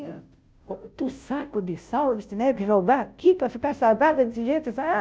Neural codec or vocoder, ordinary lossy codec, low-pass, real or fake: codec, 16 kHz, 0.5 kbps, FunCodec, trained on Chinese and English, 25 frames a second; none; none; fake